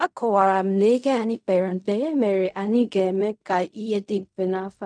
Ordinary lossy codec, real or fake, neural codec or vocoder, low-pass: none; fake; codec, 16 kHz in and 24 kHz out, 0.4 kbps, LongCat-Audio-Codec, fine tuned four codebook decoder; 9.9 kHz